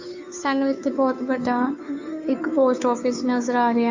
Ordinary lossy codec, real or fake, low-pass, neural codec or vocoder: AAC, 48 kbps; fake; 7.2 kHz; codec, 16 kHz, 2 kbps, FunCodec, trained on Chinese and English, 25 frames a second